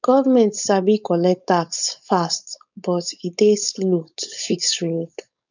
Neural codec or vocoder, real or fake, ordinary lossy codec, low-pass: codec, 16 kHz, 4.8 kbps, FACodec; fake; none; 7.2 kHz